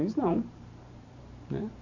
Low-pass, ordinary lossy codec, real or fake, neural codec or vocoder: 7.2 kHz; none; fake; vocoder, 44.1 kHz, 128 mel bands every 512 samples, BigVGAN v2